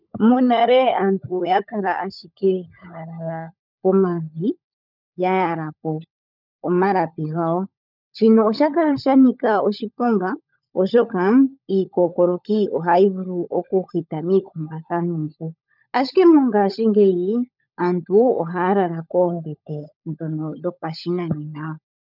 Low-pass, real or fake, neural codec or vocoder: 5.4 kHz; fake; codec, 16 kHz, 16 kbps, FunCodec, trained on LibriTTS, 50 frames a second